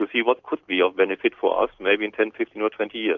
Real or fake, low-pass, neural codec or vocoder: real; 7.2 kHz; none